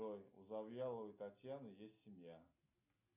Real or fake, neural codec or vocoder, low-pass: real; none; 3.6 kHz